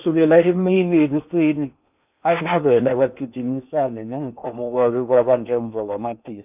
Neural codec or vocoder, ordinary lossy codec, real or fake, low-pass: codec, 16 kHz in and 24 kHz out, 0.8 kbps, FocalCodec, streaming, 65536 codes; none; fake; 3.6 kHz